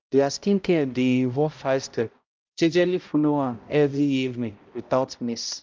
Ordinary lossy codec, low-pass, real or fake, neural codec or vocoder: Opus, 24 kbps; 7.2 kHz; fake; codec, 16 kHz, 0.5 kbps, X-Codec, HuBERT features, trained on balanced general audio